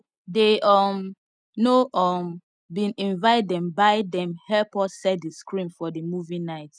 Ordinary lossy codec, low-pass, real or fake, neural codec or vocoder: none; 9.9 kHz; real; none